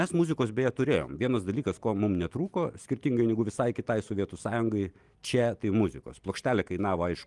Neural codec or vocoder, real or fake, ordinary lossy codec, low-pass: none; real; Opus, 24 kbps; 10.8 kHz